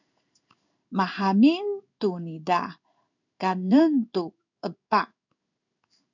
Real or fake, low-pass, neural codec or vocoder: fake; 7.2 kHz; codec, 16 kHz in and 24 kHz out, 1 kbps, XY-Tokenizer